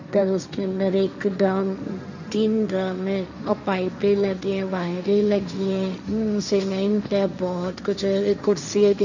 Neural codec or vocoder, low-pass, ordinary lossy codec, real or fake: codec, 16 kHz, 1.1 kbps, Voila-Tokenizer; 7.2 kHz; none; fake